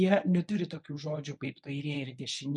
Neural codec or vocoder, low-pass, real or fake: codec, 24 kHz, 0.9 kbps, WavTokenizer, medium speech release version 1; 10.8 kHz; fake